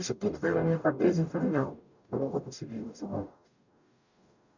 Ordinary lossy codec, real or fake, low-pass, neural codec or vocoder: none; fake; 7.2 kHz; codec, 44.1 kHz, 0.9 kbps, DAC